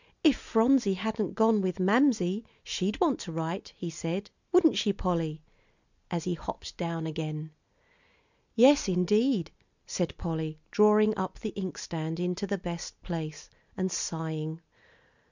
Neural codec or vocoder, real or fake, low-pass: none; real; 7.2 kHz